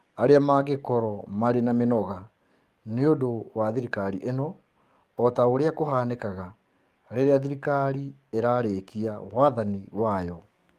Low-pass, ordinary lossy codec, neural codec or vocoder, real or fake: 14.4 kHz; Opus, 32 kbps; codec, 44.1 kHz, 7.8 kbps, DAC; fake